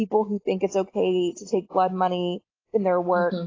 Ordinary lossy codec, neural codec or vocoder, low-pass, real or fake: AAC, 32 kbps; none; 7.2 kHz; real